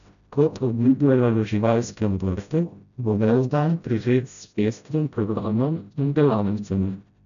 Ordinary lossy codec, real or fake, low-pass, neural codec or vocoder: none; fake; 7.2 kHz; codec, 16 kHz, 0.5 kbps, FreqCodec, smaller model